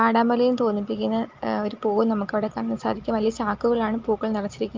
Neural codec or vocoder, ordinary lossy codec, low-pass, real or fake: none; Opus, 24 kbps; 7.2 kHz; real